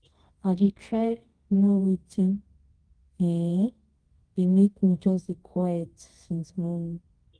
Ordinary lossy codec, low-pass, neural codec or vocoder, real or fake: Opus, 24 kbps; 9.9 kHz; codec, 24 kHz, 0.9 kbps, WavTokenizer, medium music audio release; fake